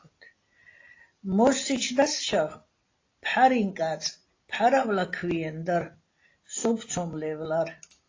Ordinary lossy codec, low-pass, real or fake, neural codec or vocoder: AAC, 32 kbps; 7.2 kHz; real; none